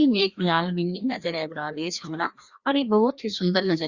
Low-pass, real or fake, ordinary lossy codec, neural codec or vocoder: 7.2 kHz; fake; Opus, 64 kbps; codec, 16 kHz, 1 kbps, FreqCodec, larger model